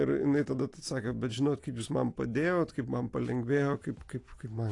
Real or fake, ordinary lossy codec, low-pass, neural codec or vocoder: real; AAC, 48 kbps; 10.8 kHz; none